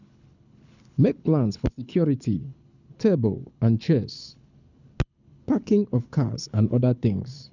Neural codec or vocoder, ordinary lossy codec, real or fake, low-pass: codec, 24 kHz, 6 kbps, HILCodec; none; fake; 7.2 kHz